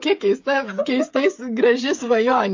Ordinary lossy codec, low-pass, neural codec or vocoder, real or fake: MP3, 48 kbps; 7.2 kHz; codec, 16 kHz, 8 kbps, FreqCodec, smaller model; fake